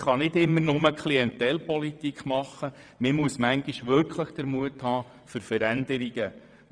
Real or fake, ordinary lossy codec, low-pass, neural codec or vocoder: fake; none; 9.9 kHz; vocoder, 22.05 kHz, 80 mel bands, WaveNeXt